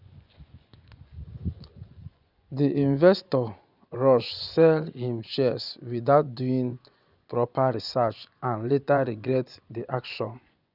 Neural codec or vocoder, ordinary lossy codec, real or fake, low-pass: vocoder, 24 kHz, 100 mel bands, Vocos; none; fake; 5.4 kHz